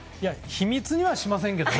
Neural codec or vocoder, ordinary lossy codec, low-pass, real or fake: none; none; none; real